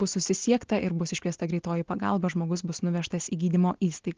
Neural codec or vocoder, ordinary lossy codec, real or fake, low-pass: none; Opus, 16 kbps; real; 7.2 kHz